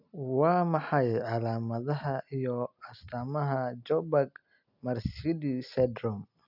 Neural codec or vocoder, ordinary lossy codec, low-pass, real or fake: none; none; 5.4 kHz; real